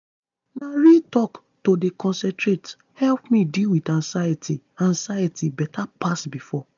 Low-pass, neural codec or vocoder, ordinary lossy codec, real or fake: 7.2 kHz; none; none; real